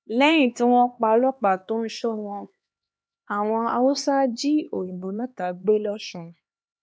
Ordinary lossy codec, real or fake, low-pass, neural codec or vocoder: none; fake; none; codec, 16 kHz, 2 kbps, X-Codec, HuBERT features, trained on LibriSpeech